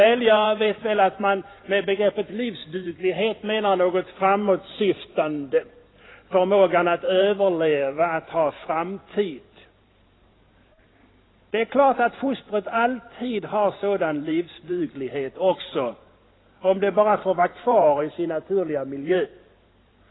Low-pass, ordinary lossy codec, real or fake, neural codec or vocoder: 7.2 kHz; AAC, 16 kbps; fake; vocoder, 44.1 kHz, 128 mel bands every 512 samples, BigVGAN v2